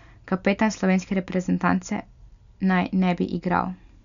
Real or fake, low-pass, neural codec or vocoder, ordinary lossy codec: real; 7.2 kHz; none; none